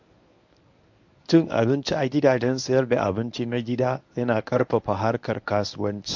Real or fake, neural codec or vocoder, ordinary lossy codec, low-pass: fake; codec, 24 kHz, 0.9 kbps, WavTokenizer, medium speech release version 1; MP3, 48 kbps; 7.2 kHz